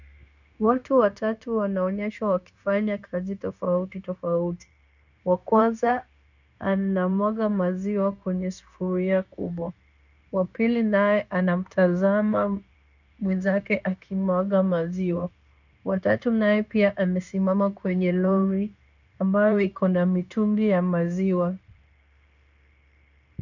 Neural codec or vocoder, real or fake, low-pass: codec, 16 kHz, 0.9 kbps, LongCat-Audio-Codec; fake; 7.2 kHz